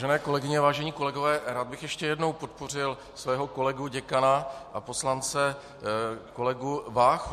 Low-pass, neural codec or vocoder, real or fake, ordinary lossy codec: 14.4 kHz; none; real; MP3, 64 kbps